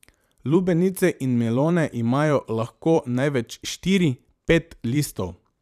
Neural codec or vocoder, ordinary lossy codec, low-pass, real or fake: vocoder, 44.1 kHz, 128 mel bands every 512 samples, BigVGAN v2; none; 14.4 kHz; fake